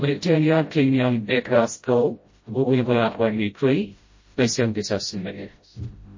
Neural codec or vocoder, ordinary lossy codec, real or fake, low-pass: codec, 16 kHz, 0.5 kbps, FreqCodec, smaller model; MP3, 32 kbps; fake; 7.2 kHz